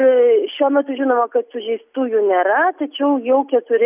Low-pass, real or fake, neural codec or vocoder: 3.6 kHz; real; none